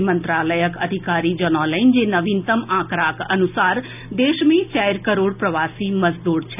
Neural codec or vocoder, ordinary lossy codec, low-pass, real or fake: none; none; 3.6 kHz; real